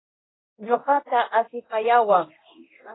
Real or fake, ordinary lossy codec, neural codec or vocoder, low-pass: fake; AAC, 16 kbps; codec, 24 kHz, 0.9 kbps, DualCodec; 7.2 kHz